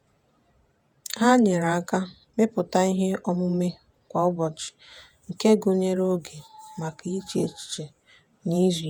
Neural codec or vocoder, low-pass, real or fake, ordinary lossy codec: vocoder, 48 kHz, 128 mel bands, Vocos; none; fake; none